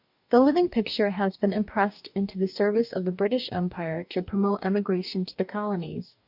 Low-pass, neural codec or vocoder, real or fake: 5.4 kHz; codec, 44.1 kHz, 2.6 kbps, DAC; fake